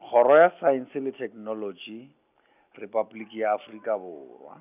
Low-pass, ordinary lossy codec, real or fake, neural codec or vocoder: 3.6 kHz; none; real; none